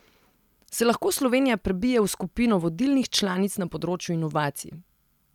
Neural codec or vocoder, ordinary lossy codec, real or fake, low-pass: none; none; real; 19.8 kHz